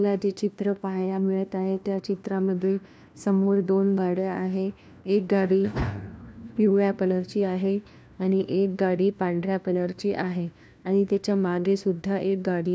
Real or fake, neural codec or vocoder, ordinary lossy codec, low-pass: fake; codec, 16 kHz, 1 kbps, FunCodec, trained on LibriTTS, 50 frames a second; none; none